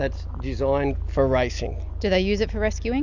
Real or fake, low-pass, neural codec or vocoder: real; 7.2 kHz; none